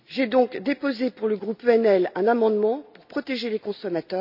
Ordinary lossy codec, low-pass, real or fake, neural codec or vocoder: none; 5.4 kHz; real; none